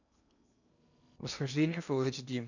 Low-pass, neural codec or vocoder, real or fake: 7.2 kHz; codec, 16 kHz in and 24 kHz out, 0.8 kbps, FocalCodec, streaming, 65536 codes; fake